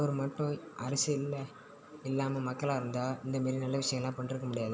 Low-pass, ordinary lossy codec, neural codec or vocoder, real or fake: none; none; none; real